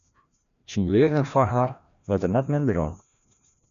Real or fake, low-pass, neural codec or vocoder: fake; 7.2 kHz; codec, 16 kHz, 1 kbps, FreqCodec, larger model